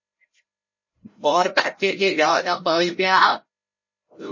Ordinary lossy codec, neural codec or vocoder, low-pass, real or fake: MP3, 32 kbps; codec, 16 kHz, 0.5 kbps, FreqCodec, larger model; 7.2 kHz; fake